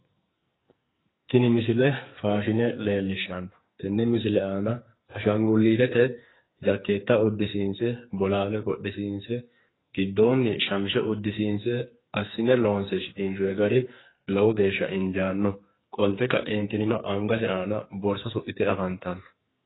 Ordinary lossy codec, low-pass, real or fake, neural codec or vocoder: AAC, 16 kbps; 7.2 kHz; fake; codec, 44.1 kHz, 2.6 kbps, SNAC